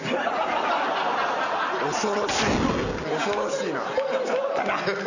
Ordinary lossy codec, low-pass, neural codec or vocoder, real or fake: none; 7.2 kHz; none; real